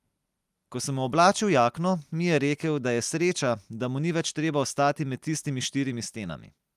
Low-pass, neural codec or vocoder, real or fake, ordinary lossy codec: 14.4 kHz; none; real; Opus, 32 kbps